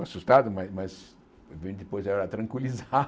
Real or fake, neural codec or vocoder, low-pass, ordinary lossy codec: real; none; none; none